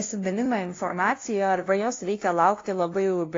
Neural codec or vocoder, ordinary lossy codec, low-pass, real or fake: codec, 16 kHz, 0.5 kbps, FunCodec, trained on LibriTTS, 25 frames a second; AAC, 32 kbps; 7.2 kHz; fake